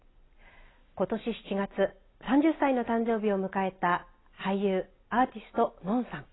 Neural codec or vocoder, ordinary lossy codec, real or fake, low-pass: none; AAC, 16 kbps; real; 7.2 kHz